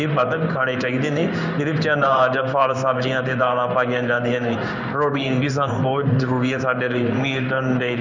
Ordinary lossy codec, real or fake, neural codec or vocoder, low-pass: none; fake; codec, 16 kHz in and 24 kHz out, 1 kbps, XY-Tokenizer; 7.2 kHz